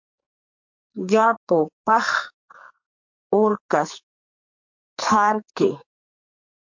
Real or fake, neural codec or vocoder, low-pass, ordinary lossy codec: fake; codec, 44.1 kHz, 2.6 kbps, SNAC; 7.2 kHz; MP3, 48 kbps